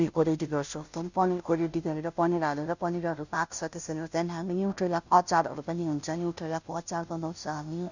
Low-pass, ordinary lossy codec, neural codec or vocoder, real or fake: 7.2 kHz; none; codec, 16 kHz, 0.5 kbps, FunCodec, trained on Chinese and English, 25 frames a second; fake